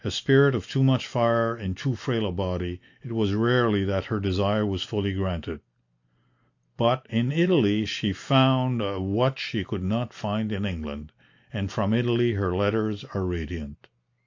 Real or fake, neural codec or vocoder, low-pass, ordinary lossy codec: real; none; 7.2 kHz; AAC, 48 kbps